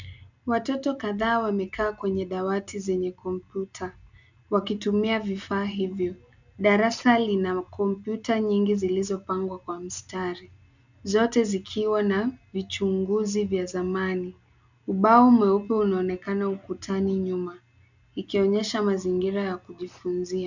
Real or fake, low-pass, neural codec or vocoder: real; 7.2 kHz; none